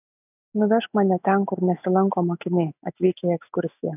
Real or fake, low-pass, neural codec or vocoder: real; 3.6 kHz; none